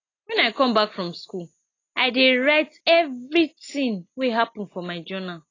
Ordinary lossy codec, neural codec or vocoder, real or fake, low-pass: AAC, 32 kbps; none; real; 7.2 kHz